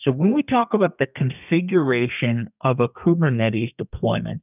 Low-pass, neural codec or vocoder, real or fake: 3.6 kHz; codec, 32 kHz, 1.9 kbps, SNAC; fake